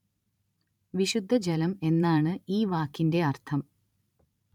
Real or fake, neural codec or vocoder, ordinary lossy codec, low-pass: fake; vocoder, 44.1 kHz, 128 mel bands every 512 samples, BigVGAN v2; none; 19.8 kHz